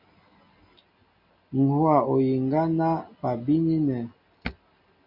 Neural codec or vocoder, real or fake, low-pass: none; real; 5.4 kHz